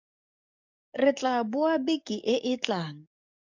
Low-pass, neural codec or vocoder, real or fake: 7.2 kHz; codec, 44.1 kHz, 7.8 kbps, DAC; fake